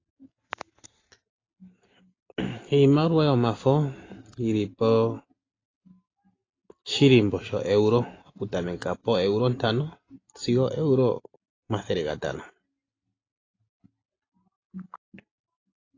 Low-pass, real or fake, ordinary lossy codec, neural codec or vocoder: 7.2 kHz; real; AAC, 32 kbps; none